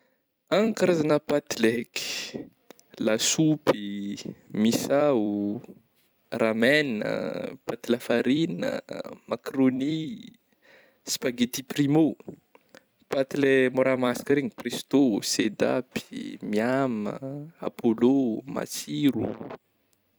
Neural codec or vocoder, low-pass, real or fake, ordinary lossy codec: vocoder, 44.1 kHz, 128 mel bands every 256 samples, BigVGAN v2; none; fake; none